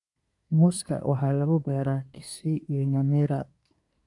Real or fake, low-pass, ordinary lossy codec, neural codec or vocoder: fake; 10.8 kHz; none; codec, 24 kHz, 1 kbps, SNAC